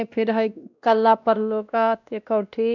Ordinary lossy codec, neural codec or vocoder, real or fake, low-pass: none; codec, 16 kHz, 1 kbps, X-Codec, WavLM features, trained on Multilingual LibriSpeech; fake; 7.2 kHz